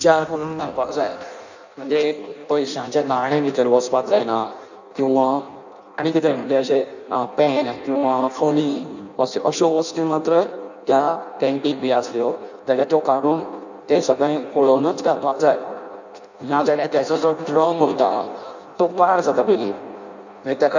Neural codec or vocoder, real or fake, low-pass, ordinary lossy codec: codec, 16 kHz in and 24 kHz out, 0.6 kbps, FireRedTTS-2 codec; fake; 7.2 kHz; none